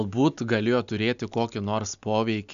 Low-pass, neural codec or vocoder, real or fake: 7.2 kHz; none; real